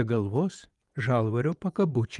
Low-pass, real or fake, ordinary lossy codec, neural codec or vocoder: 10.8 kHz; fake; Opus, 32 kbps; vocoder, 24 kHz, 100 mel bands, Vocos